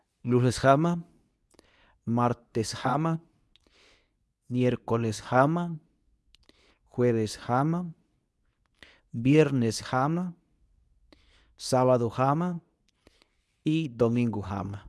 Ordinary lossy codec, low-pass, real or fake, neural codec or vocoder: none; none; fake; codec, 24 kHz, 0.9 kbps, WavTokenizer, medium speech release version 2